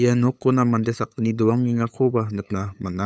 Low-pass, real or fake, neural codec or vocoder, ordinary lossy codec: none; fake; codec, 16 kHz, 8 kbps, FunCodec, trained on LibriTTS, 25 frames a second; none